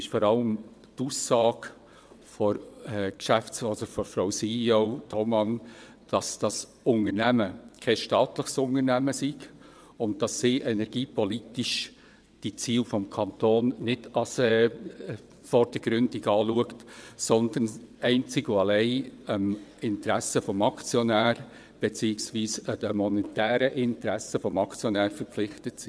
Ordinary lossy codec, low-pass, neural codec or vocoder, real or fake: none; none; vocoder, 22.05 kHz, 80 mel bands, WaveNeXt; fake